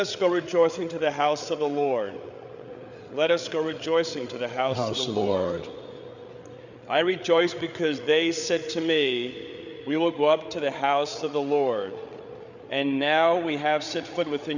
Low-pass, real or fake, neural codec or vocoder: 7.2 kHz; fake; codec, 16 kHz, 8 kbps, FreqCodec, larger model